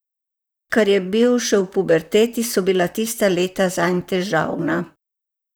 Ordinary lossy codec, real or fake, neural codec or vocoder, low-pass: none; fake; vocoder, 44.1 kHz, 128 mel bands, Pupu-Vocoder; none